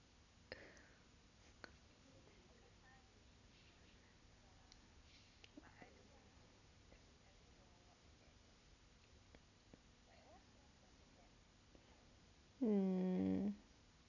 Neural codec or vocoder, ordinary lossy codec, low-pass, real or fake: none; none; 7.2 kHz; real